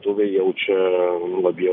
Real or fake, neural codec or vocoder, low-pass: real; none; 5.4 kHz